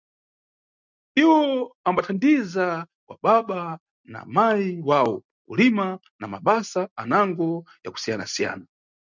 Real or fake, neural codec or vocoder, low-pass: real; none; 7.2 kHz